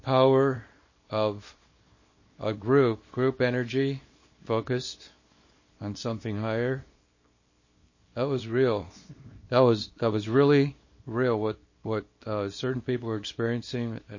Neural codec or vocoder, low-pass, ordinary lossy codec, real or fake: codec, 24 kHz, 0.9 kbps, WavTokenizer, small release; 7.2 kHz; MP3, 32 kbps; fake